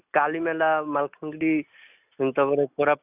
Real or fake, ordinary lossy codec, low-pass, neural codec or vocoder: real; none; 3.6 kHz; none